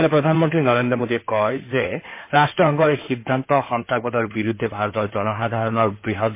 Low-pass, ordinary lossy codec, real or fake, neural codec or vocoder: 3.6 kHz; MP3, 24 kbps; fake; codec, 16 kHz in and 24 kHz out, 2.2 kbps, FireRedTTS-2 codec